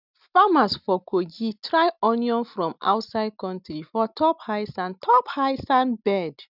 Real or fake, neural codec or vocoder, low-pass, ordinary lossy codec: real; none; 5.4 kHz; none